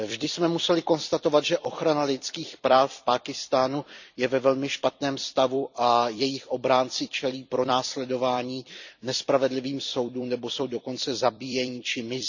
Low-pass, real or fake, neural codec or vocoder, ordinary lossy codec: 7.2 kHz; fake; vocoder, 44.1 kHz, 128 mel bands every 256 samples, BigVGAN v2; none